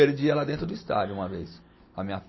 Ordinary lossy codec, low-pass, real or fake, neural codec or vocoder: MP3, 24 kbps; 7.2 kHz; real; none